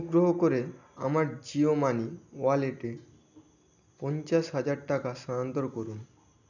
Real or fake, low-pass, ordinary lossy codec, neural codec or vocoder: real; 7.2 kHz; none; none